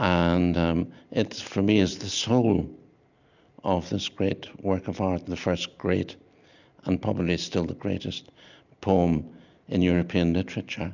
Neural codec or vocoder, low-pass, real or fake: none; 7.2 kHz; real